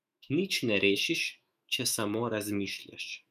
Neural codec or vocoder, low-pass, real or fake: autoencoder, 48 kHz, 128 numbers a frame, DAC-VAE, trained on Japanese speech; 14.4 kHz; fake